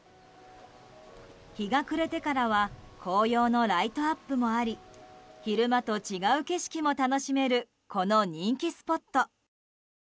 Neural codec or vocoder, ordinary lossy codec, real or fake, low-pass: none; none; real; none